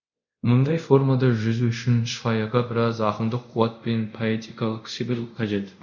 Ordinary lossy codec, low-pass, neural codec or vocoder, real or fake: none; 7.2 kHz; codec, 24 kHz, 0.5 kbps, DualCodec; fake